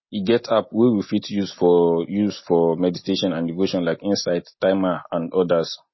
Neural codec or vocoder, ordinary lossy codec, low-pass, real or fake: none; MP3, 24 kbps; 7.2 kHz; real